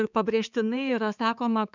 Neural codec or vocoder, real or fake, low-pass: codec, 16 kHz, 4 kbps, X-Codec, HuBERT features, trained on balanced general audio; fake; 7.2 kHz